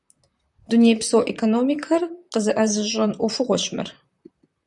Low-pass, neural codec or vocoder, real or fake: 10.8 kHz; vocoder, 44.1 kHz, 128 mel bands, Pupu-Vocoder; fake